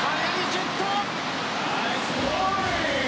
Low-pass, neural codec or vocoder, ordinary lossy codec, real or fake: none; none; none; real